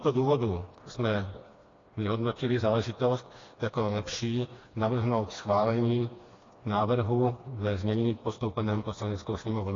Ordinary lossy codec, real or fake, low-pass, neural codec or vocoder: AAC, 32 kbps; fake; 7.2 kHz; codec, 16 kHz, 2 kbps, FreqCodec, smaller model